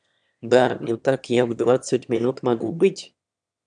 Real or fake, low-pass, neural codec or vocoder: fake; 9.9 kHz; autoencoder, 22.05 kHz, a latent of 192 numbers a frame, VITS, trained on one speaker